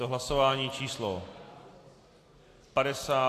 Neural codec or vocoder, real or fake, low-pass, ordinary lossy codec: none; real; 14.4 kHz; AAC, 64 kbps